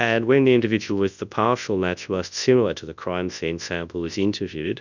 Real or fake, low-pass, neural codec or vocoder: fake; 7.2 kHz; codec, 24 kHz, 0.9 kbps, WavTokenizer, large speech release